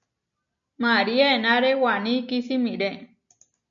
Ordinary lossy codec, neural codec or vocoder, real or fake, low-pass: MP3, 48 kbps; none; real; 7.2 kHz